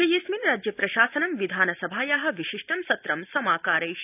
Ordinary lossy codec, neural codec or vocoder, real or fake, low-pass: none; none; real; 3.6 kHz